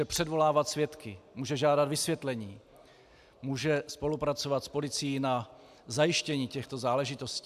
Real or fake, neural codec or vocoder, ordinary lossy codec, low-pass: real; none; AAC, 96 kbps; 14.4 kHz